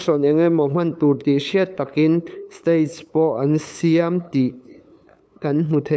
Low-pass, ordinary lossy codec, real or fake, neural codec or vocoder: none; none; fake; codec, 16 kHz, 8 kbps, FunCodec, trained on LibriTTS, 25 frames a second